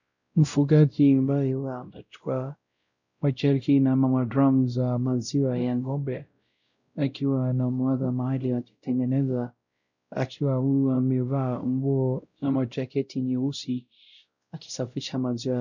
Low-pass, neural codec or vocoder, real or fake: 7.2 kHz; codec, 16 kHz, 0.5 kbps, X-Codec, WavLM features, trained on Multilingual LibriSpeech; fake